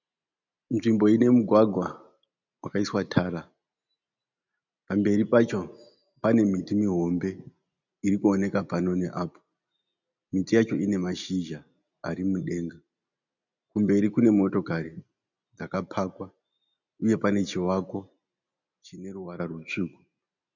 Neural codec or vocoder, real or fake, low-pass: none; real; 7.2 kHz